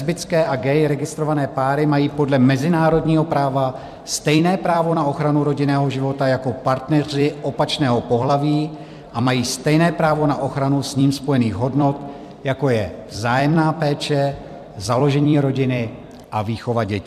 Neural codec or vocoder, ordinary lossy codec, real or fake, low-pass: vocoder, 44.1 kHz, 128 mel bands every 256 samples, BigVGAN v2; MP3, 96 kbps; fake; 14.4 kHz